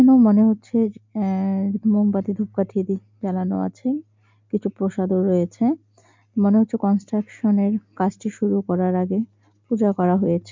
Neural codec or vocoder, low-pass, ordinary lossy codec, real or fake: none; 7.2 kHz; MP3, 64 kbps; real